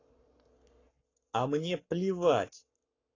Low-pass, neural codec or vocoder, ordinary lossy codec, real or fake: 7.2 kHz; codec, 44.1 kHz, 7.8 kbps, Pupu-Codec; AAC, 32 kbps; fake